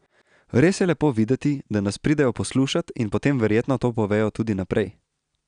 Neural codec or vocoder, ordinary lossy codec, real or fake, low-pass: none; none; real; 9.9 kHz